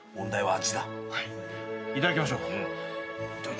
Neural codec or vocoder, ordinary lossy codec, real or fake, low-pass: none; none; real; none